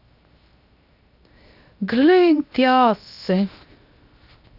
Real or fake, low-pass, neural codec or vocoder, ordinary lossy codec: fake; 5.4 kHz; codec, 16 kHz, 0.7 kbps, FocalCodec; none